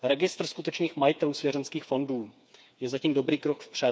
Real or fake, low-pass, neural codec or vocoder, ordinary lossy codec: fake; none; codec, 16 kHz, 4 kbps, FreqCodec, smaller model; none